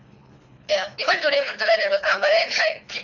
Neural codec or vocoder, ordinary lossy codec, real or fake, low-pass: codec, 24 kHz, 3 kbps, HILCodec; Opus, 64 kbps; fake; 7.2 kHz